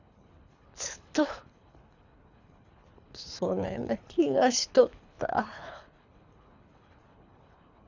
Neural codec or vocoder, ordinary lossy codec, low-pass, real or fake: codec, 24 kHz, 3 kbps, HILCodec; none; 7.2 kHz; fake